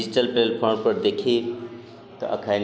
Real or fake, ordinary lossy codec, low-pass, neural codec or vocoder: real; none; none; none